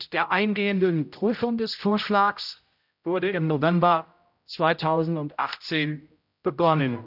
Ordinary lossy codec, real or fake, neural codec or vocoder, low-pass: none; fake; codec, 16 kHz, 0.5 kbps, X-Codec, HuBERT features, trained on general audio; 5.4 kHz